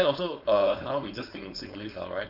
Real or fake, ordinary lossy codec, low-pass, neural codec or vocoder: fake; none; 5.4 kHz; codec, 16 kHz, 4.8 kbps, FACodec